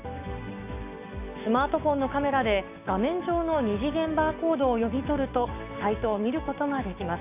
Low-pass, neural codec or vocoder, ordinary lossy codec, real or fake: 3.6 kHz; codec, 16 kHz, 6 kbps, DAC; none; fake